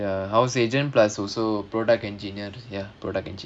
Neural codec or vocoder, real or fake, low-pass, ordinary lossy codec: none; real; 9.9 kHz; none